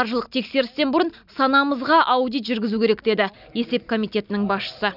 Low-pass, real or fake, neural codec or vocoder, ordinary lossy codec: 5.4 kHz; real; none; none